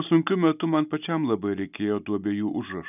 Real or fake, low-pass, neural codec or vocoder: real; 3.6 kHz; none